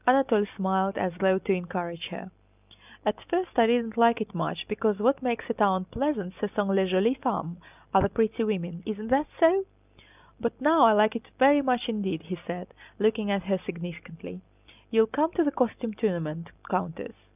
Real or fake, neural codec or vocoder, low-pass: real; none; 3.6 kHz